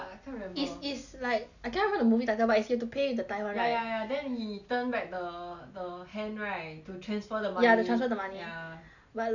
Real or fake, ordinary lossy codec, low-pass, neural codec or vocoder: real; none; 7.2 kHz; none